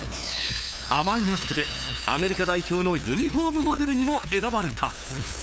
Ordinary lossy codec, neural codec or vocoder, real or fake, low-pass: none; codec, 16 kHz, 2 kbps, FunCodec, trained on LibriTTS, 25 frames a second; fake; none